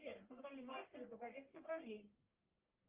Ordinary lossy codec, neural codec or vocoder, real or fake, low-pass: Opus, 32 kbps; codec, 44.1 kHz, 1.7 kbps, Pupu-Codec; fake; 3.6 kHz